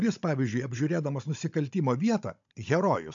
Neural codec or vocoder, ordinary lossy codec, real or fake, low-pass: codec, 16 kHz, 16 kbps, FunCodec, trained on Chinese and English, 50 frames a second; MP3, 64 kbps; fake; 7.2 kHz